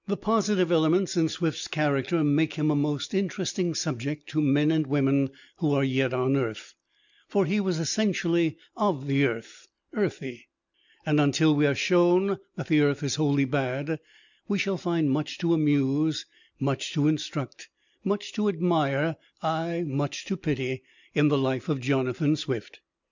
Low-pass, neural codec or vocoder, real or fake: 7.2 kHz; none; real